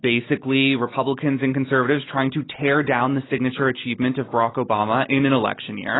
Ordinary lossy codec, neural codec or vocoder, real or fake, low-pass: AAC, 16 kbps; none; real; 7.2 kHz